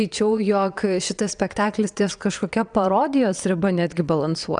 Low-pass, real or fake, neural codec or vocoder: 9.9 kHz; fake; vocoder, 22.05 kHz, 80 mel bands, Vocos